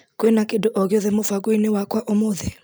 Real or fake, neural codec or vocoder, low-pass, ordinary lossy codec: real; none; none; none